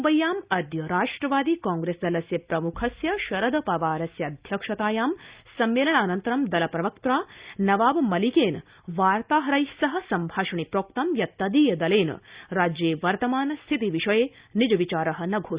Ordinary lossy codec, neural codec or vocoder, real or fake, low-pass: Opus, 64 kbps; none; real; 3.6 kHz